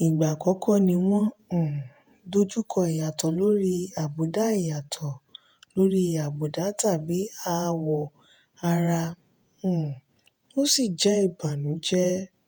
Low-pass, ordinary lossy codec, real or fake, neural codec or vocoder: none; none; fake; vocoder, 48 kHz, 128 mel bands, Vocos